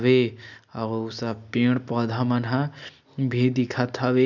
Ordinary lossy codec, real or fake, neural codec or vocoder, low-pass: none; real; none; 7.2 kHz